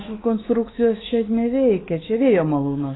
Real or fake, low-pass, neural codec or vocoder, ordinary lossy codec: fake; 7.2 kHz; autoencoder, 48 kHz, 128 numbers a frame, DAC-VAE, trained on Japanese speech; AAC, 16 kbps